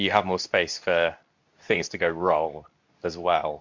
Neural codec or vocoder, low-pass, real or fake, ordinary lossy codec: codec, 24 kHz, 0.9 kbps, WavTokenizer, medium speech release version 2; 7.2 kHz; fake; MP3, 64 kbps